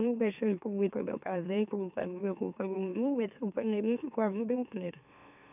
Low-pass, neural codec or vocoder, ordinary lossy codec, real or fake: 3.6 kHz; autoencoder, 44.1 kHz, a latent of 192 numbers a frame, MeloTTS; none; fake